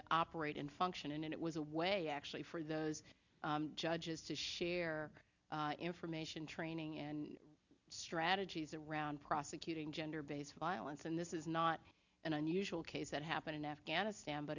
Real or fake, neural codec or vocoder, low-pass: real; none; 7.2 kHz